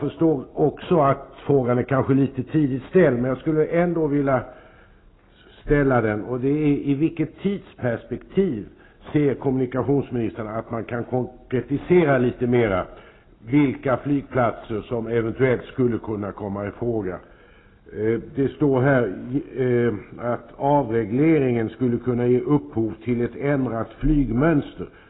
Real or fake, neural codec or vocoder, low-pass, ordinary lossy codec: real; none; 7.2 kHz; AAC, 16 kbps